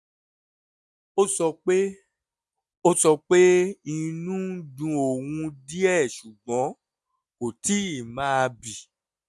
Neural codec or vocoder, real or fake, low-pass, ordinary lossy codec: none; real; none; none